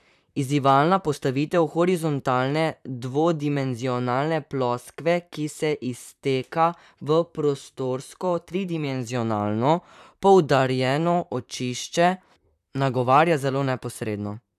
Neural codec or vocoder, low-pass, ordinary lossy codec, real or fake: vocoder, 44.1 kHz, 128 mel bands, Pupu-Vocoder; 14.4 kHz; none; fake